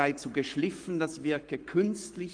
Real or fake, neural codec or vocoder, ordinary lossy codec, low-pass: fake; codec, 44.1 kHz, 7.8 kbps, Pupu-Codec; Opus, 32 kbps; 9.9 kHz